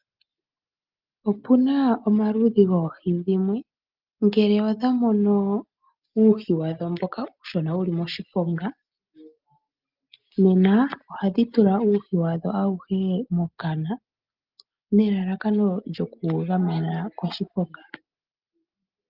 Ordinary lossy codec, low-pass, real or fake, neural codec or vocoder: Opus, 24 kbps; 5.4 kHz; real; none